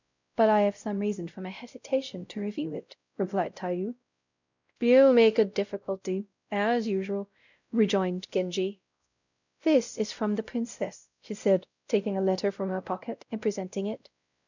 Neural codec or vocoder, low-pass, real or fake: codec, 16 kHz, 0.5 kbps, X-Codec, WavLM features, trained on Multilingual LibriSpeech; 7.2 kHz; fake